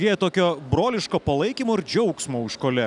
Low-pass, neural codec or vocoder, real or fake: 10.8 kHz; none; real